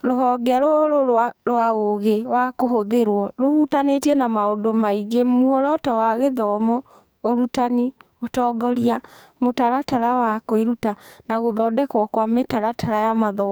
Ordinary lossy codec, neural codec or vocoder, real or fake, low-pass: none; codec, 44.1 kHz, 2.6 kbps, SNAC; fake; none